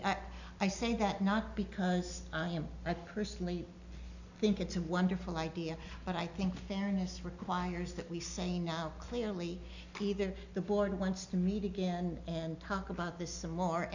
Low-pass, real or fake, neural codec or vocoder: 7.2 kHz; real; none